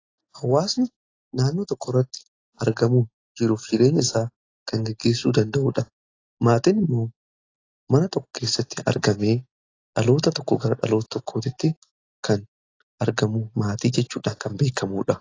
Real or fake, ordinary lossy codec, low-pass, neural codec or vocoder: real; AAC, 32 kbps; 7.2 kHz; none